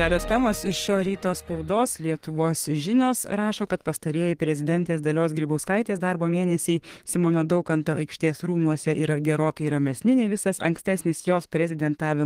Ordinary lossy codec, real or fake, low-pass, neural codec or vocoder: Opus, 24 kbps; fake; 14.4 kHz; codec, 32 kHz, 1.9 kbps, SNAC